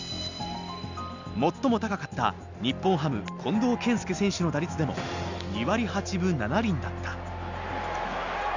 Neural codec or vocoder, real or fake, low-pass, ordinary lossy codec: none; real; 7.2 kHz; none